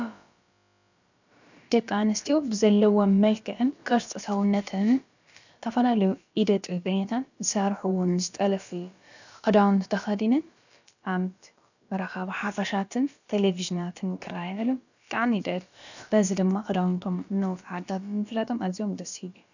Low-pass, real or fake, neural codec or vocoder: 7.2 kHz; fake; codec, 16 kHz, about 1 kbps, DyCAST, with the encoder's durations